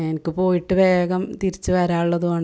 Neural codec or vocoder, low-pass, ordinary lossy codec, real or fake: none; none; none; real